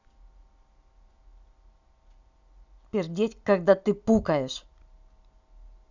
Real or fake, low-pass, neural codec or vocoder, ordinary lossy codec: real; 7.2 kHz; none; none